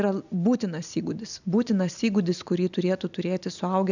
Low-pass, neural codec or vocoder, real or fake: 7.2 kHz; none; real